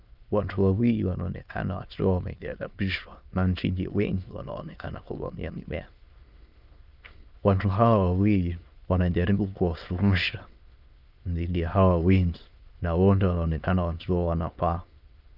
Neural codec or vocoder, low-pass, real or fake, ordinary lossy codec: autoencoder, 22.05 kHz, a latent of 192 numbers a frame, VITS, trained on many speakers; 5.4 kHz; fake; Opus, 24 kbps